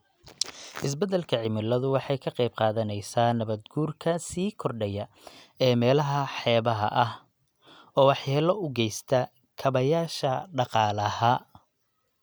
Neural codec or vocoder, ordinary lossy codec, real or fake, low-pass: none; none; real; none